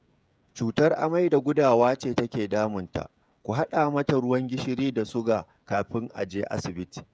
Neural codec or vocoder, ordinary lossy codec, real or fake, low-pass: codec, 16 kHz, 16 kbps, FreqCodec, smaller model; none; fake; none